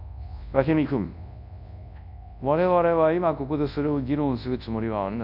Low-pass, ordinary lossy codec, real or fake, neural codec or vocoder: 5.4 kHz; none; fake; codec, 24 kHz, 0.9 kbps, WavTokenizer, large speech release